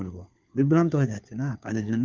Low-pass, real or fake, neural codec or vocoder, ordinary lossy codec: 7.2 kHz; fake; codec, 16 kHz, 4 kbps, FunCodec, trained on LibriTTS, 50 frames a second; Opus, 32 kbps